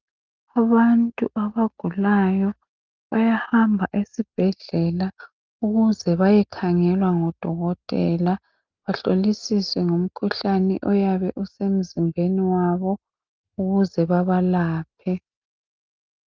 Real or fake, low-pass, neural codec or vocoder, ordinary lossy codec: real; 7.2 kHz; none; Opus, 24 kbps